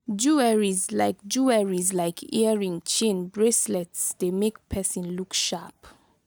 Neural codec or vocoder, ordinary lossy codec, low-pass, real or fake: none; none; none; real